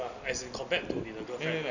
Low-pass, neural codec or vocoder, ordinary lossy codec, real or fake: 7.2 kHz; none; none; real